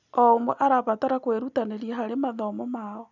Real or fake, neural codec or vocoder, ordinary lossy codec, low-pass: real; none; none; 7.2 kHz